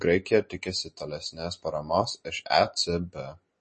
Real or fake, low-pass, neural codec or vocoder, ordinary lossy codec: real; 10.8 kHz; none; MP3, 32 kbps